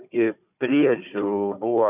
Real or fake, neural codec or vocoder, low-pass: fake; codec, 16 kHz, 4 kbps, FunCodec, trained on Chinese and English, 50 frames a second; 3.6 kHz